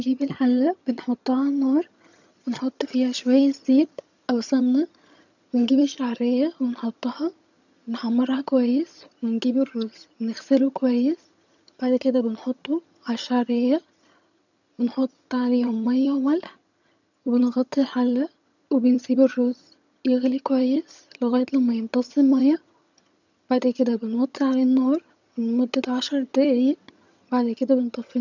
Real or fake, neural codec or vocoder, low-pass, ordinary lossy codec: fake; vocoder, 22.05 kHz, 80 mel bands, HiFi-GAN; 7.2 kHz; none